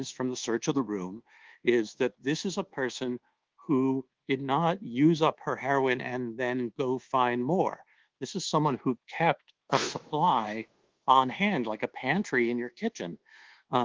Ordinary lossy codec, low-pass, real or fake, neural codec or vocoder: Opus, 16 kbps; 7.2 kHz; fake; codec, 24 kHz, 1.2 kbps, DualCodec